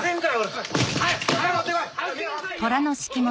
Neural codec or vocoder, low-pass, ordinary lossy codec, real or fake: none; none; none; real